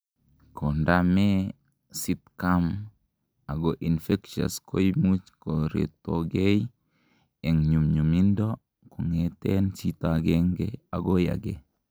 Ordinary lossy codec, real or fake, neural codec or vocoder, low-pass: none; real; none; none